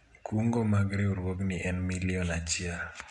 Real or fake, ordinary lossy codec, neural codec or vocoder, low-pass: real; none; none; 10.8 kHz